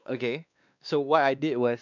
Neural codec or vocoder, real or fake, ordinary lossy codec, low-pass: codec, 16 kHz, 2 kbps, X-Codec, WavLM features, trained on Multilingual LibriSpeech; fake; none; 7.2 kHz